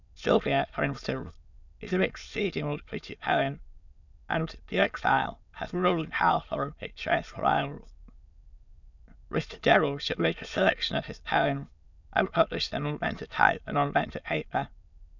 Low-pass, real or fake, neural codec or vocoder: 7.2 kHz; fake; autoencoder, 22.05 kHz, a latent of 192 numbers a frame, VITS, trained on many speakers